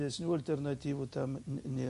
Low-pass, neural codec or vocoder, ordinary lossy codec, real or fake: 14.4 kHz; none; MP3, 48 kbps; real